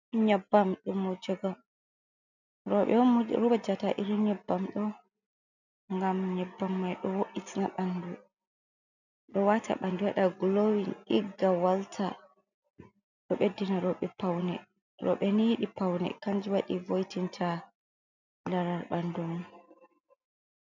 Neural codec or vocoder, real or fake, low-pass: none; real; 7.2 kHz